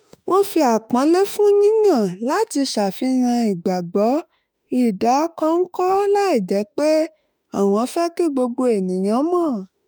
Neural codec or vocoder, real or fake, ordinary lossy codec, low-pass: autoencoder, 48 kHz, 32 numbers a frame, DAC-VAE, trained on Japanese speech; fake; none; none